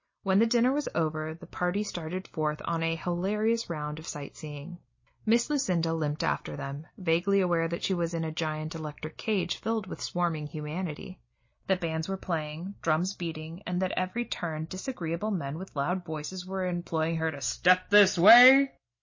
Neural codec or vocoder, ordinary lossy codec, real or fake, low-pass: none; MP3, 32 kbps; real; 7.2 kHz